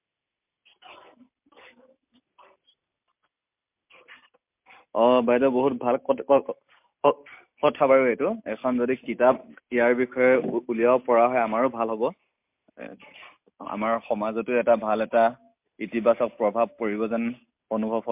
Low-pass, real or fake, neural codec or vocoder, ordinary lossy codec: 3.6 kHz; real; none; MP3, 32 kbps